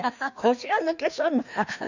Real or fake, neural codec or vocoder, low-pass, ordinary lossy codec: fake; codec, 16 kHz, 2 kbps, FreqCodec, larger model; 7.2 kHz; none